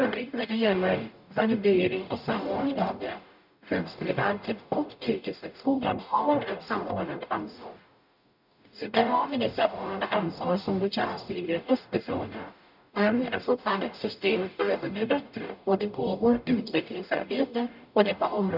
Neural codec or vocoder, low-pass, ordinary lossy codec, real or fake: codec, 44.1 kHz, 0.9 kbps, DAC; 5.4 kHz; none; fake